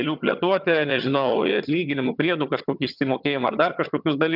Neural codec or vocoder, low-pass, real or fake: vocoder, 22.05 kHz, 80 mel bands, HiFi-GAN; 5.4 kHz; fake